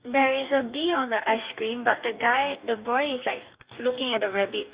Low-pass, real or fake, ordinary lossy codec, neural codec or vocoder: 3.6 kHz; fake; Opus, 64 kbps; codec, 44.1 kHz, 2.6 kbps, DAC